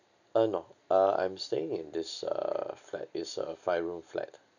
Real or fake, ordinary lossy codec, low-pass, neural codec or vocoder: real; none; 7.2 kHz; none